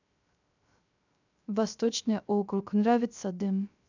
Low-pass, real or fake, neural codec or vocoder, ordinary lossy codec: 7.2 kHz; fake; codec, 16 kHz, 0.3 kbps, FocalCodec; none